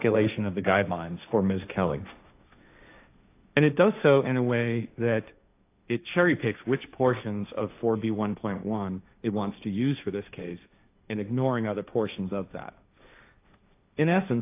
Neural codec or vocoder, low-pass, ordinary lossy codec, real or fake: codec, 16 kHz, 1.1 kbps, Voila-Tokenizer; 3.6 kHz; AAC, 24 kbps; fake